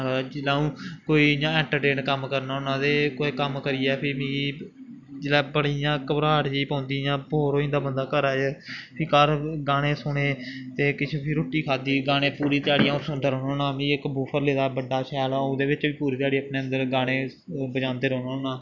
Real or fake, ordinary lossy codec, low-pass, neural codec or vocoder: real; none; 7.2 kHz; none